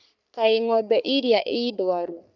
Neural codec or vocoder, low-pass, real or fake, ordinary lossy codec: codec, 44.1 kHz, 3.4 kbps, Pupu-Codec; 7.2 kHz; fake; none